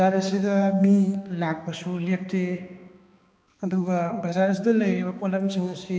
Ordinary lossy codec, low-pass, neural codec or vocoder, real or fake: none; none; codec, 16 kHz, 2 kbps, X-Codec, HuBERT features, trained on balanced general audio; fake